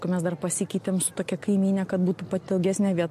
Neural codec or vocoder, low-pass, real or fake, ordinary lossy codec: none; 14.4 kHz; real; MP3, 64 kbps